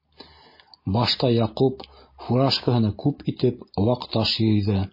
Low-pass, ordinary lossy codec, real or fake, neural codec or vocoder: 5.4 kHz; MP3, 24 kbps; real; none